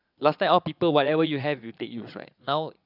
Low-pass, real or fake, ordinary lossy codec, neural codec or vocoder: 5.4 kHz; fake; none; vocoder, 22.05 kHz, 80 mel bands, Vocos